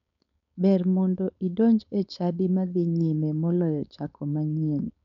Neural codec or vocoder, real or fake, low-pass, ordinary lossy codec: codec, 16 kHz, 4.8 kbps, FACodec; fake; 7.2 kHz; none